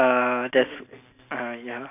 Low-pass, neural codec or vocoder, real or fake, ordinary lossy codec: 3.6 kHz; none; real; none